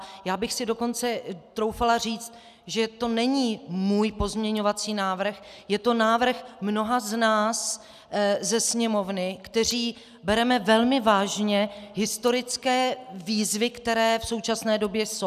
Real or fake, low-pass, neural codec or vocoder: real; 14.4 kHz; none